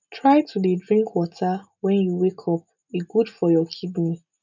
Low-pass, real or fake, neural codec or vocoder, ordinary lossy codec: 7.2 kHz; real; none; none